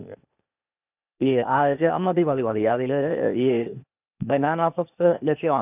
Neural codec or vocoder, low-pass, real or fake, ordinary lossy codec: codec, 16 kHz, 0.8 kbps, ZipCodec; 3.6 kHz; fake; none